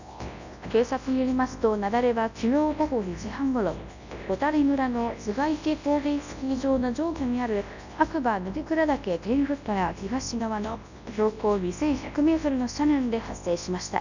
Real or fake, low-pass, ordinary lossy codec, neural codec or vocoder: fake; 7.2 kHz; none; codec, 24 kHz, 0.9 kbps, WavTokenizer, large speech release